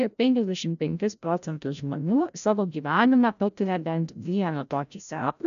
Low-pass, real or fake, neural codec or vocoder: 7.2 kHz; fake; codec, 16 kHz, 0.5 kbps, FreqCodec, larger model